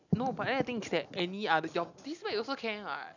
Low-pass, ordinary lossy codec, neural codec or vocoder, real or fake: 7.2 kHz; none; none; real